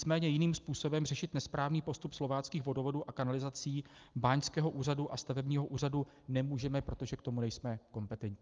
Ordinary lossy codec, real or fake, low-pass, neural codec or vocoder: Opus, 32 kbps; real; 7.2 kHz; none